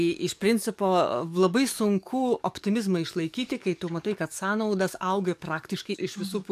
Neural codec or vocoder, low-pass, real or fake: codec, 44.1 kHz, 7.8 kbps, DAC; 14.4 kHz; fake